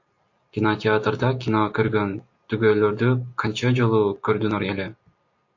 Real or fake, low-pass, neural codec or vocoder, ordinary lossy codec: real; 7.2 kHz; none; AAC, 48 kbps